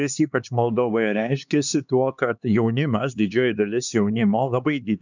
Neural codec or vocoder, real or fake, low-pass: codec, 16 kHz, 2 kbps, X-Codec, HuBERT features, trained on LibriSpeech; fake; 7.2 kHz